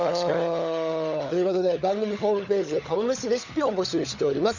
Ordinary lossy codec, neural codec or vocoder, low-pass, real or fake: none; codec, 16 kHz, 16 kbps, FunCodec, trained on LibriTTS, 50 frames a second; 7.2 kHz; fake